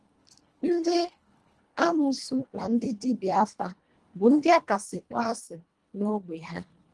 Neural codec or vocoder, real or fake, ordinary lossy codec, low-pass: codec, 24 kHz, 1.5 kbps, HILCodec; fake; Opus, 24 kbps; 10.8 kHz